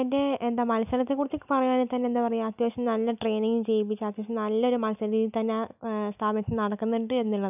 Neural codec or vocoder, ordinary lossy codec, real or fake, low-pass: none; none; real; 3.6 kHz